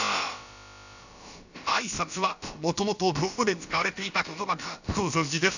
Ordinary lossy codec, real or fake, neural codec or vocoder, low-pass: none; fake; codec, 16 kHz, about 1 kbps, DyCAST, with the encoder's durations; 7.2 kHz